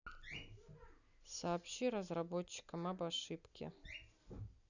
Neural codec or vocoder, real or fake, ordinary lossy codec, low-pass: none; real; none; 7.2 kHz